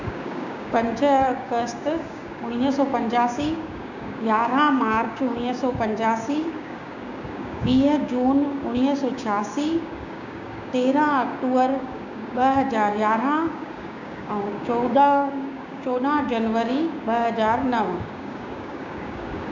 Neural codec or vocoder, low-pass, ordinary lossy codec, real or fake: codec, 16 kHz, 6 kbps, DAC; 7.2 kHz; none; fake